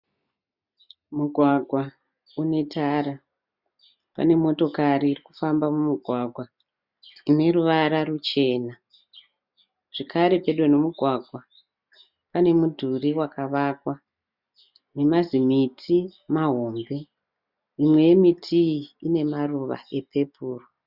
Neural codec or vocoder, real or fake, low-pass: none; real; 5.4 kHz